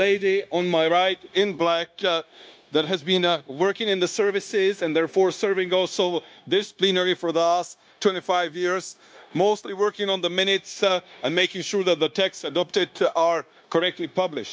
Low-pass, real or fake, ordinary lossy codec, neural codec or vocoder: none; fake; none; codec, 16 kHz, 0.9 kbps, LongCat-Audio-Codec